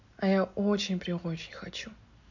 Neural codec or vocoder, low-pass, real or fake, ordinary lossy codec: none; 7.2 kHz; real; none